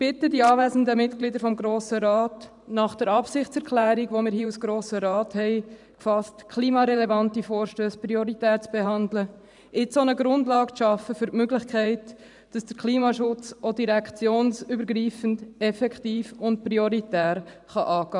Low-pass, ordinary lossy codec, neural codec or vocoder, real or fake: 10.8 kHz; none; vocoder, 44.1 kHz, 128 mel bands every 512 samples, BigVGAN v2; fake